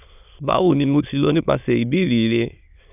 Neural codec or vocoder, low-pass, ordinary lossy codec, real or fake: autoencoder, 22.05 kHz, a latent of 192 numbers a frame, VITS, trained on many speakers; 3.6 kHz; none; fake